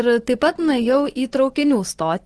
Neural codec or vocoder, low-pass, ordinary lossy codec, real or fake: vocoder, 24 kHz, 100 mel bands, Vocos; 10.8 kHz; Opus, 16 kbps; fake